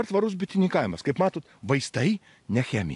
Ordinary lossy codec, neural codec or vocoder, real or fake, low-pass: AAC, 64 kbps; none; real; 10.8 kHz